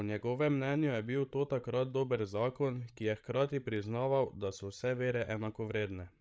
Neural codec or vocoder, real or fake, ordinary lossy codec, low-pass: codec, 16 kHz, 16 kbps, FreqCodec, larger model; fake; none; none